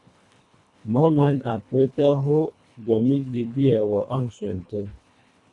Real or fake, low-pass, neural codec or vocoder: fake; 10.8 kHz; codec, 24 kHz, 1.5 kbps, HILCodec